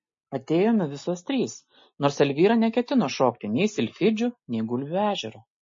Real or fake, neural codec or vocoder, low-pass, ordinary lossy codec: real; none; 7.2 kHz; MP3, 32 kbps